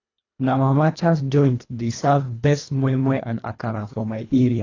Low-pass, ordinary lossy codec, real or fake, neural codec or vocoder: 7.2 kHz; AAC, 32 kbps; fake; codec, 24 kHz, 1.5 kbps, HILCodec